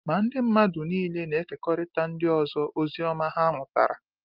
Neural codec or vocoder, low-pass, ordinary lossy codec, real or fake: none; 5.4 kHz; Opus, 32 kbps; real